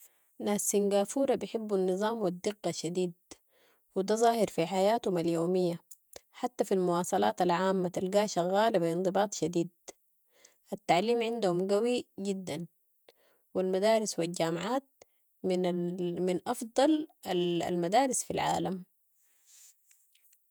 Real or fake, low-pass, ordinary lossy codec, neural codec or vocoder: fake; none; none; vocoder, 48 kHz, 128 mel bands, Vocos